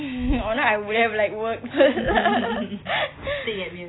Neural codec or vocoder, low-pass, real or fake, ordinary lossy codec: none; 7.2 kHz; real; AAC, 16 kbps